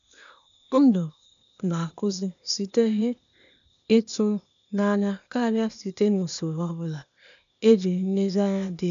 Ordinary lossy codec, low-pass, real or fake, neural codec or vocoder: none; 7.2 kHz; fake; codec, 16 kHz, 0.8 kbps, ZipCodec